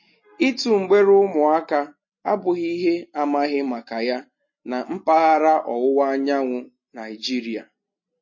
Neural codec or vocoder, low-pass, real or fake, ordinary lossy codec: none; 7.2 kHz; real; MP3, 32 kbps